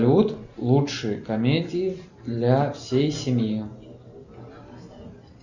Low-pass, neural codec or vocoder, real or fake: 7.2 kHz; none; real